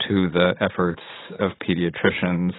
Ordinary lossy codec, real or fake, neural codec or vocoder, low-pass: AAC, 16 kbps; fake; vocoder, 44.1 kHz, 80 mel bands, Vocos; 7.2 kHz